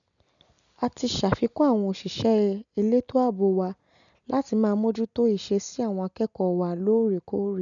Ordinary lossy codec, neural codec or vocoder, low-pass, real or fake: none; none; 7.2 kHz; real